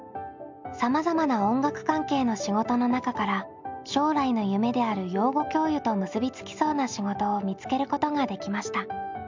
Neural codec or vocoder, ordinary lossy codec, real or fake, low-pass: none; MP3, 64 kbps; real; 7.2 kHz